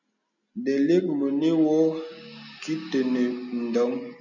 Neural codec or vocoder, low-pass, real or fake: none; 7.2 kHz; real